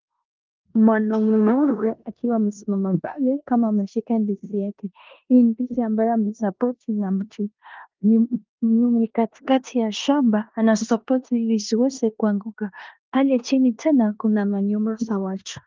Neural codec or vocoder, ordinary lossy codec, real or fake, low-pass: codec, 16 kHz in and 24 kHz out, 0.9 kbps, LongCat-Audio-Codec, four codebook decoder; Opus, 32 kbps; fake; 7.2 kHz